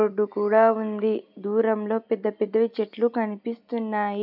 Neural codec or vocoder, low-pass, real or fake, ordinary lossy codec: none; 5.4 kHz; real; none